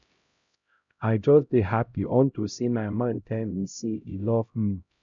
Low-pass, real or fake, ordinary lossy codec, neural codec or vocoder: 7.2 kHz; fake; none; codec, 16 kHz, 0.5 kbps, X-Codec, HuBERT features, trained on LibriSpeech